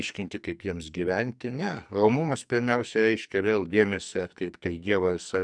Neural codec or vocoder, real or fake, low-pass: codec, 44.1 kHz, 2.6 kbps, SNAC; fake; 9.9 kHz